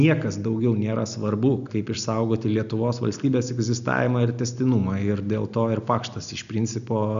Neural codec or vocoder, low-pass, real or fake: none; 7.2 kHz; real